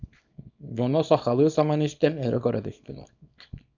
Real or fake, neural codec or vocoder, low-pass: fake; codec, 24 kHz, 0.9 kbps, WavTokenizer, small release; 7.2 kHz